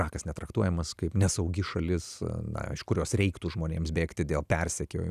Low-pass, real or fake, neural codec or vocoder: 14.4 kHz; real; none